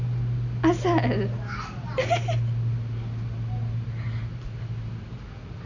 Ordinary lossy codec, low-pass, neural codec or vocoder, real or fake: none; 7.2 kHz; none; real